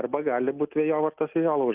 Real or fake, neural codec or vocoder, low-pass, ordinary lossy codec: real; none; 3.6 kHz; Opus, 32 kbps